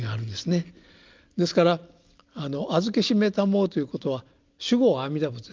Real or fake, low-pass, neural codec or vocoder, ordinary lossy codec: real; 7.2 kHz; none; Opus, 24 kbps